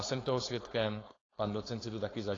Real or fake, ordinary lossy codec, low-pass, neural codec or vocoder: fake; AAC, 32 kbps; 7.2 kHz; codec, 16 kHz, 4.8 kbps, FACodec